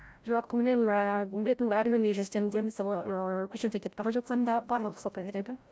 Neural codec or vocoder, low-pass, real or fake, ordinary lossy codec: codec, 16 kHz, 0.5 kbps, FreqCodec, larger model; none; fake; none